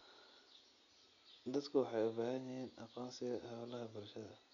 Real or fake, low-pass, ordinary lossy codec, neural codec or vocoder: real; 7.2 kHz; none; none